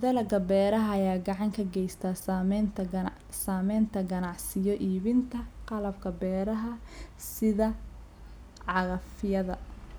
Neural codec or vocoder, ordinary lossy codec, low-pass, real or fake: none; none; none; real